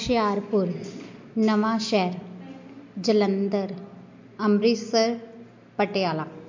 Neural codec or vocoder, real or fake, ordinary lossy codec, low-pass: none; real; MP3, 48 kbps; 7.2 kHz